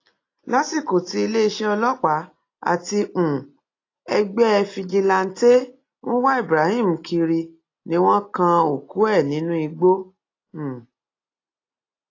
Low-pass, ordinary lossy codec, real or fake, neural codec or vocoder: 7.2 kHz; AAC, 32 kbps; real; none